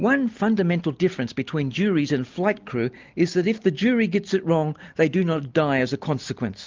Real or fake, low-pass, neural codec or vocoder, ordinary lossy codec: real; 7.2 kHz; none; Opus, 24 kbps